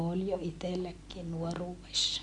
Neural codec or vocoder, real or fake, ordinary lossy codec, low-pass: none; real; none; 10.8 kHz